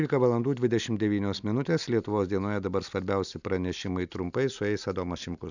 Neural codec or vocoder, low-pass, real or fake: none; 7.2 kHz; real